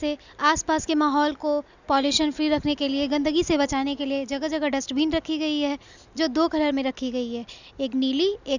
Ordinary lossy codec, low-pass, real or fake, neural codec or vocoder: none; 7.2 kHz; real; none